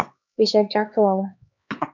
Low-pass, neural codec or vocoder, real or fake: 7.2 kHz; codec, 16 kHz, 2 kbps, X-Codec, HuBERT features, trained on LibriSpeech; fake